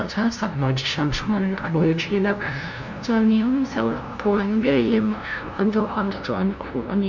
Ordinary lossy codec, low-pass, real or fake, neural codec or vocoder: none; 7.2 kHz; fake; codec, 16 kHz, 0.5 kbps, FunCodec, trained on LibriTTS, 25 frames a second